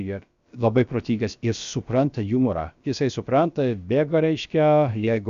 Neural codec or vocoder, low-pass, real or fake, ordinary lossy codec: codec, 16 kHz, about 1 kbps, DyCAST, with the encoder's durations; 7.2 kHz; fake; Opus, 64 kbps